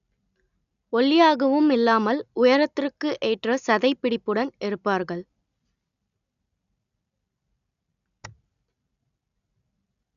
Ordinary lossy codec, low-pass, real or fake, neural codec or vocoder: none; 7.2 kHz; real; none